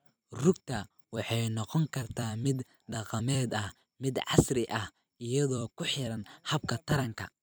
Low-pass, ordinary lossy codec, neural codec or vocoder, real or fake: none; none; vocoder, 44.1 kHz, 128 mel bands every 256 samples, BigVGAN v2; fake